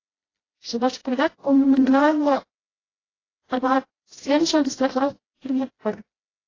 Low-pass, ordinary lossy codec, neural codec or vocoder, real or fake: 7.2 kHz; AAC, 32 kbps; codec, 16 kHz, 0.5 kbps, FreqCodec, smaller model; fake